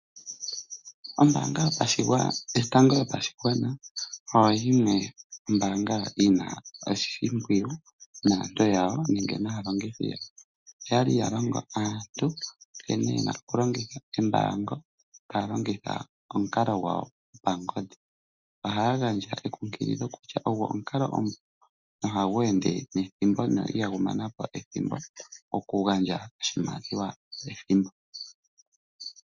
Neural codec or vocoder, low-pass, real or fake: none; 7.2 kHz; real